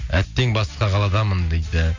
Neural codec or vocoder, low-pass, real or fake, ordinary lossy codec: none; 7.2 kHz; real; MP3, 48 kbps